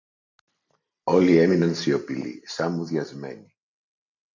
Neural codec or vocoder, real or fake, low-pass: none; real; 7.2 kHz